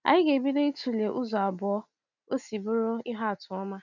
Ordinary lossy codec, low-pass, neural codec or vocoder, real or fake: none; 7.2 kHz; none; real